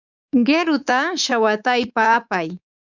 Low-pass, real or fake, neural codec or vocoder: 7.2 kHz; fake; codec, 24 kHz, 3.1 kbps, DualCodec